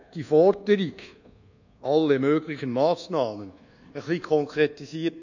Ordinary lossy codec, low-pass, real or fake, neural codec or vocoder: none; 7.2 kHz; fake; codec, 24 kHz, 1.2 kbps, DualCodec